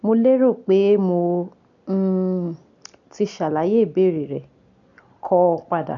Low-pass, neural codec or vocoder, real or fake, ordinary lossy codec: 7.2 kHz; none; real; none